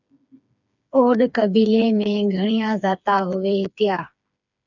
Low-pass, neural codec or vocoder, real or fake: 7.2 kHz; codec, 16 kHz, 4 kbps, FreqCodec, smaller model; fake